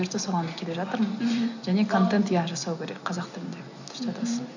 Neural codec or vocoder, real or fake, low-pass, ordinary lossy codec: none; real; 7.2 kHz; none